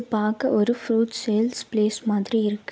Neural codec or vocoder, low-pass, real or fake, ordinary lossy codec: none; none; real; none